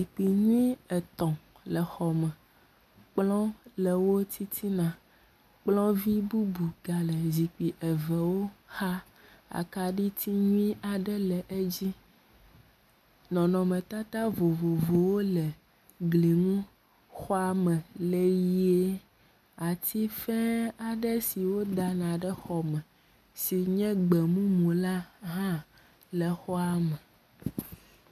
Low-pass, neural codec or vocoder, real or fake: 14.4 kHz; none; real